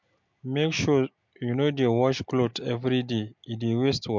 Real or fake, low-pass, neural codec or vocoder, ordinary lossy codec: real; 7.2 kHz; none; MP3, 64 kbps